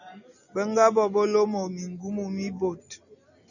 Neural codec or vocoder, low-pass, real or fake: none; 7.2 kHz; real